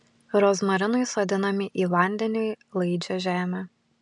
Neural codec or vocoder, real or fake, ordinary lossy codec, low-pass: none; real; MP3, 96 kbps; 10.8 kHz